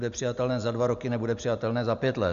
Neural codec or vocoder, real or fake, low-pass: none; real; 7.2 kHz